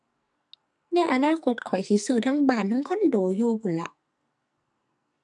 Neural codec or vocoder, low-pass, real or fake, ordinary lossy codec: codec, 44.1 kHz, 2.6 kbps, SNAC; 10.8 kHz; fake; MP3, 96 kbps